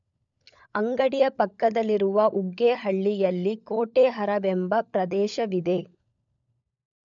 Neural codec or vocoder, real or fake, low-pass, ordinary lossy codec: codec, 16 kHz, 16 kbps, FunCodec, trained on LibriTTS, 50 frames a second; fake; 7.2 kHz; none